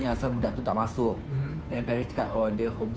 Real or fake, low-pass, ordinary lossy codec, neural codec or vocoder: fake; none; none; codec, 16 kHz, 2 kbps, FunCodec, trained on Chinese and English, 25 frames a second